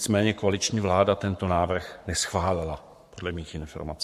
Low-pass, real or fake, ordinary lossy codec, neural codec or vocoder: 14.4 kHz; fake; MP3, 64 kbps; codec, 44.1 kHz, 7.8 kbps, Pupu-Codec